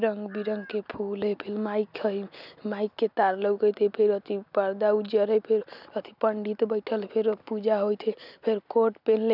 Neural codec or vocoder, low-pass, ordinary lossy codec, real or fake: none; 5.4 kHz; none; real